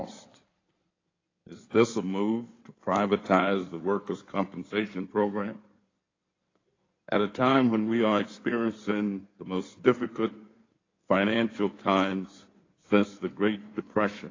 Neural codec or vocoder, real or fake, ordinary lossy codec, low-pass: codec, 16 kHz in and 24 kHz out, 2.2 kbps, FireRedTTS-2 codec; fake; AAC, 32 kbps; 7.2 kHz